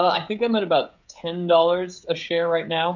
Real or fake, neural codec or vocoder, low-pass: fake; codec, 16 kHz, 16 kbps, FreqCodec, smaller model; 7.2 kHz